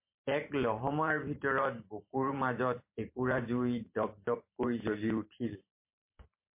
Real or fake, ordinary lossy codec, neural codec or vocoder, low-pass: fake; MP3, 24 kbps; vocoder, 44.1 kHz, 128 mel bands every 512 samples, BigVGAN v2; 3.6 kHz